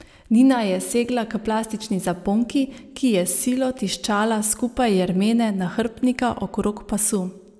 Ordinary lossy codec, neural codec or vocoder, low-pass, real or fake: none; none; none; real